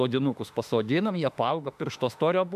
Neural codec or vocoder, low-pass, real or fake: autoencoder, 48 kHz, 32 numbers a frame, DAC-VAE, trained on Japanese speech; 14.4 kHz; fake